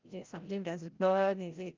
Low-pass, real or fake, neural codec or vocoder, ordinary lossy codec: 7.2 kHz; fake; codec, 16 kHz, 0.5 kbps, FreqCodec, larger model; Opus, 24 kbps